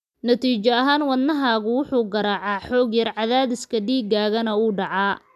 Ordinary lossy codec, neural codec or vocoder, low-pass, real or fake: none; none; 14.4 kHz; real